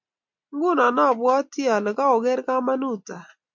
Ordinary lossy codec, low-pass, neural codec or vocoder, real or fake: MP3, 64 kbps; 7.2 kHz; none; real